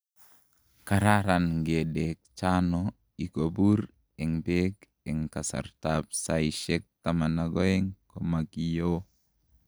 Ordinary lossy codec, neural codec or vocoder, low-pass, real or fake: none; none; none; real